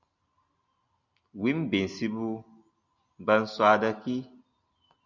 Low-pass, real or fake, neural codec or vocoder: 7.2 kHz; real; none